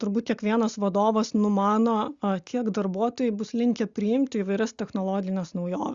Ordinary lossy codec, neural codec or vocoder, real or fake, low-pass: Opus, 64 kbps; none; real; 7.2 kHz